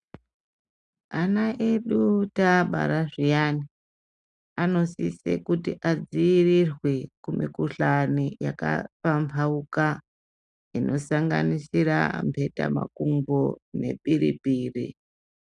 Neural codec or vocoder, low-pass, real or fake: none; 10.8 kHz; real